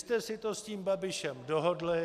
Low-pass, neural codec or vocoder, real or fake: 14.4 kHz; none; real